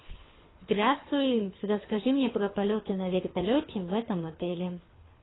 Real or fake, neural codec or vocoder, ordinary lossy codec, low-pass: fake; codec, 24 kHz, 3 kbps, HILCodec; AAC, 16 kbps; 7.2 kHz